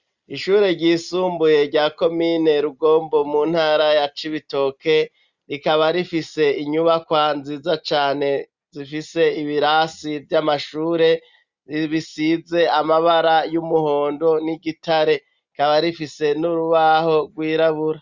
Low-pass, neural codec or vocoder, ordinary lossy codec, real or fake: 7.2 kHz; none; Opus, 64 kbps; real